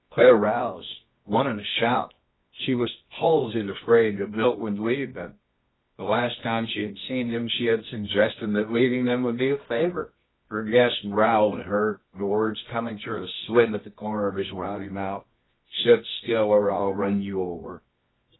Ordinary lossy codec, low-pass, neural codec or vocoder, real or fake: AAC, 16 kbps; 7.2 kHz; codec, 24 kHz, 0.9 kbps, WavTokenizer, medium music audio release; fake